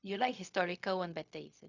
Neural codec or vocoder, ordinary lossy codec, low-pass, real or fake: codec, 16 kHz, 0.4 kbps, LongCat-Audio-Codec; none; 7.2 kHz; fake